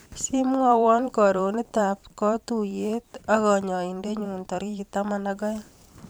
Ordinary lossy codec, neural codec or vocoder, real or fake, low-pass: none; vocoder, 44.1 kHz, 128 mel bands every 512 samples, BigVGAN v2; fake; none